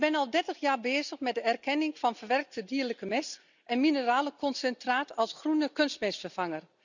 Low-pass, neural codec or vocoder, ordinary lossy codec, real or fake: 7.2 kHz; none; none; real